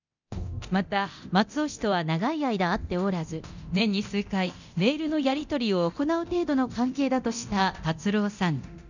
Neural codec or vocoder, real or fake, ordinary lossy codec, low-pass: codec, 24 kHz, 0.9 kbps, DualCodec; fake; none; 7.2 kHz